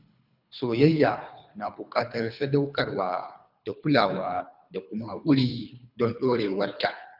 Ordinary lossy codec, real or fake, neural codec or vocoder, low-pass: none; fake; codec, 24 kHz, 3 kbps, HILCodec; 5.4 kHz